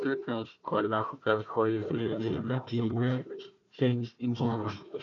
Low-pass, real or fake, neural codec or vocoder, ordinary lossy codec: 7.2 kHz; fake; codec, 16 kHz, 1 kbps, FunCodec, trained on Chinese and English, 50 frames a second; none